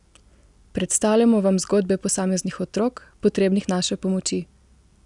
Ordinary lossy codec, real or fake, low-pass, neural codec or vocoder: none; real; 10.8 kHz; none